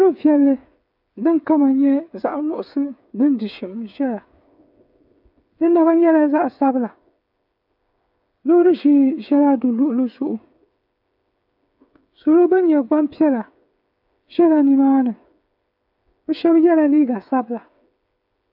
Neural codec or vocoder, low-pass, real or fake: codec, 16 kHz, 8 kbps, FreqCodec, smaller model; 5.4 kHz; fake